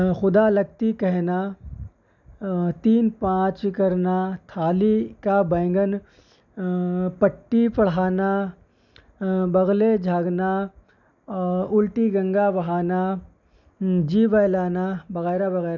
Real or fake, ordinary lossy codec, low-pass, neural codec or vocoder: real; none; 7.2 kHz; none